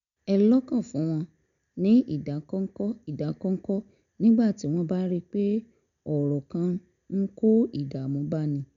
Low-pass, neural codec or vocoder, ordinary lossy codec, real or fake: 7.2 kHz; none; none; real